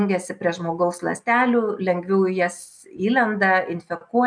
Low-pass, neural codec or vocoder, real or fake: 9.9 kHz; none; real